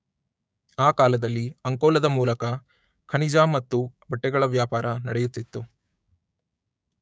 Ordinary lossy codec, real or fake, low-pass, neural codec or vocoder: none; fake; none; codec, 16 kHz, 6 kbps, DAC